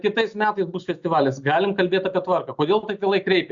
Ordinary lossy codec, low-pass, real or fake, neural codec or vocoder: Opus, 64 kbps; 7.2 kHz; real; none